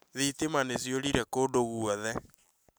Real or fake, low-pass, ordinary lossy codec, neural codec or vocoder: real; none; none; none